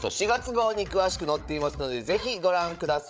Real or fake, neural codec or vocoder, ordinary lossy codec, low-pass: fake; codec, 16 kHz, 8 kbps, FreqCodec, larger model; none; none